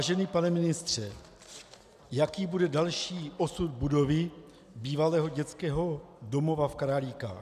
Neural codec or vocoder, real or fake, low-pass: none; real; 14.4 kHz